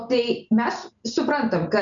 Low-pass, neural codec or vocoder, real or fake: 7.2 kHz; none; real